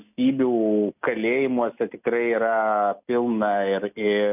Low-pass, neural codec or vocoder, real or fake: 3.6 kHz; none; real